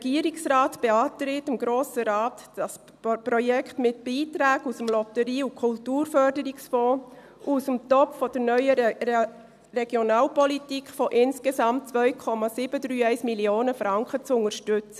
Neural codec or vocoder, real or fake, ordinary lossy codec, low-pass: none; real; none; 14.4 kHz